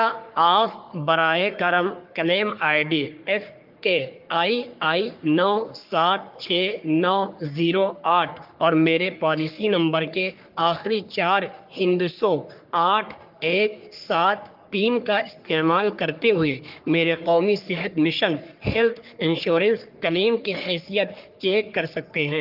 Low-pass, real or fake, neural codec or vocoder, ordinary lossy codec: 5.4 kHz; fake; codec, 44.1 kHz, 3.4 kbps, Pupu-Codec; Opus, 24 kbps